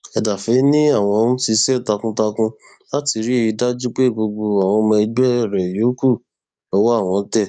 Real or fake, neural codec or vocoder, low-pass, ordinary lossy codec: fake; codec, 44.1 kHz, 7.8 kbps, DAC; 9.9 kHz; none